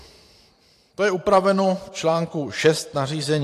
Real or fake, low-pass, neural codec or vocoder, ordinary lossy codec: fake; 14.4 kHz; vocoder, 44.1 kHz, 128 mel bands every 512 samples, BigVGAN v2; AAC, 64 kbps